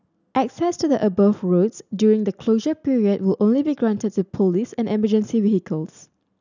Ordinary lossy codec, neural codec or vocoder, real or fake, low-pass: none; none; real; 7.2 kHz